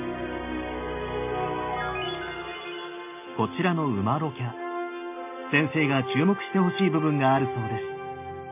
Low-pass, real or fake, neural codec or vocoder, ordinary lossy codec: 3.6 kHz; real; none; none